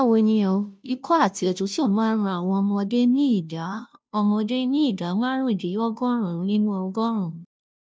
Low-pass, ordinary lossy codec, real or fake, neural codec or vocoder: none; none; fake; codec, 16 kHz, 0.5 kbps, FunCodec, trained on Chinese and English, 25 frames a second